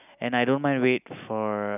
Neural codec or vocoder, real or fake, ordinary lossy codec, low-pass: none; real; none; 3.6 kHz